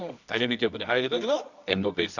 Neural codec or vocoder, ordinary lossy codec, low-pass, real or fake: codec, 24 kHz, 0.9 kbps, WavTokenizer, medium music audio release; none; 7.2 kHz; fake